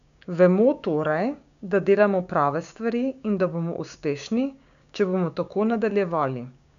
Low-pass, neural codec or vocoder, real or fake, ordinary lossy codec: 7.2 kHz; codec, 16 kHz, 6 kbps, DAC; fake; none